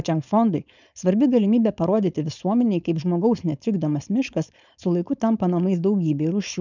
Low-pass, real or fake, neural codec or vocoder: 7.2 kHz; fake; codec, 16 kHz, 4.8 kbps, FACodec